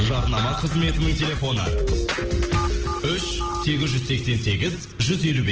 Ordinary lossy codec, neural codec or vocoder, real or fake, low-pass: Opus, 16 kbps; none; real; 7.2 kHz